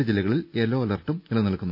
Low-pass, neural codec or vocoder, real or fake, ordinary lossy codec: 5.4 kHz; none; real; none